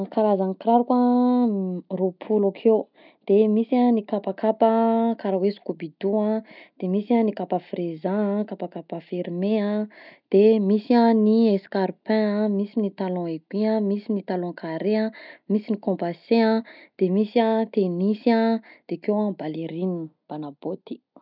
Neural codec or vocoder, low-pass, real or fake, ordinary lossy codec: none; 5.4 kHz; real; none